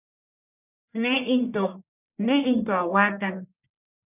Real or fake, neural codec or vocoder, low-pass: fake; codec, 44.1 kHz, 1.7 kbps, Pupu-Codec; 3.6 kHz